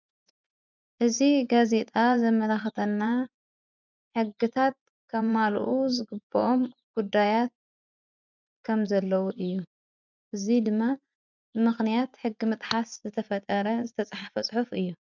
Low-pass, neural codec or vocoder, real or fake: 7.2 kHz; vocoder, 24 kHz, 100 mel bands, Vocos; fake